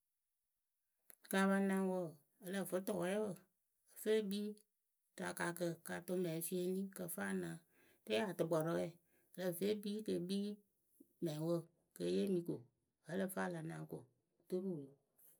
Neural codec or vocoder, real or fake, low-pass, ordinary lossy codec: none; real; none; none